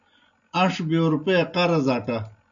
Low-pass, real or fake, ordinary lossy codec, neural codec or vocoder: 7.2 kHz; real; MP3, 96 kbps; none